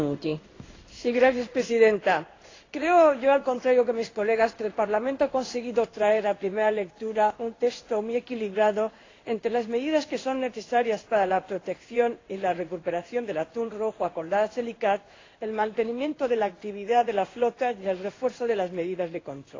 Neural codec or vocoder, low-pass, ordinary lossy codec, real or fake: codec, 16 kHz in and 24 kHz out, 1 kbps, XY-Tokenizer; 7.2 kHz; AAC, 32 kbps; fake